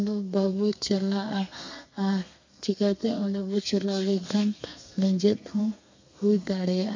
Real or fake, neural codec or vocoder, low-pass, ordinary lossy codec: fake; codec, 32 kHz, 1.9 kbps, SNAC; 7.2 kHz; MP3, 64 kbps